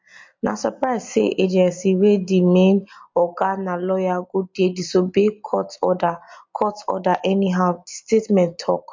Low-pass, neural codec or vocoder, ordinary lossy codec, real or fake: 7.2 kHz; none; MP3, 48 kbps; real